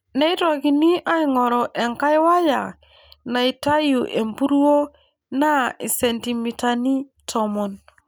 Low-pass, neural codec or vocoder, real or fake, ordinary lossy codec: none; none; real; none